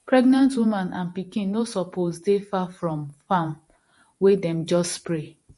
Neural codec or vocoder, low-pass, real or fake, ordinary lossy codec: vocoder, 48 kHz, 128 mel bands, Vocos; 14.4 kHz; fake; MP3, 48 kbps